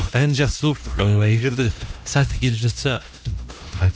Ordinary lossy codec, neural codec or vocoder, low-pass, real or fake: none; codec, 16 kHz, 1 kbps, X-Codec, HuBERT features, trained on LibriSpeech; none; fake